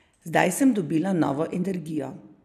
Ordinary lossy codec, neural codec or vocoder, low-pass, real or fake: none; none; 14.4 kHz; real